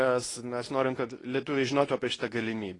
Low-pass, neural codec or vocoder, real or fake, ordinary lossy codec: 10.8 kHz; codec, 24 kHz, 0.9 kbps, WavTokenizer, medium speech release version 2; fake; AAC, 32 kbps